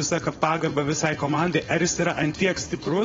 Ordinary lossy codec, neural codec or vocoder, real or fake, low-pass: AAC, 24 kbps; codec, 16 kHz, 4.8 kbps, FACodec; fake; 7.2 kHz